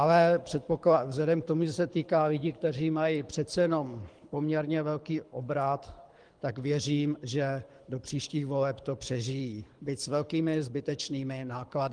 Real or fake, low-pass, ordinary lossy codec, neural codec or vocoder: fake; 9.9 kHz; Opus, 24 kbps; codec, 24 kHz, 6 kbps, HILCodec